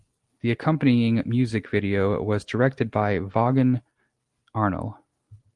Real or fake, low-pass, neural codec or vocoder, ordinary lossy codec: real; 10.8 kHz; none; Opus, 24 kbps